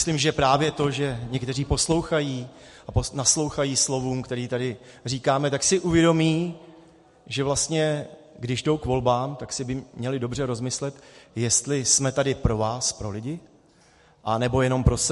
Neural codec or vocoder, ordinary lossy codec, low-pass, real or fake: none; MP3, 48 kbps; 14.4 kHz; real